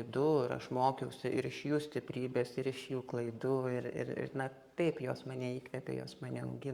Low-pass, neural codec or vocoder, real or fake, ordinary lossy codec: 19.8 kHz; codec, 44.1 kHz, 7.8 kbps, Pupu-Codec; fake; Opus, 64 kbps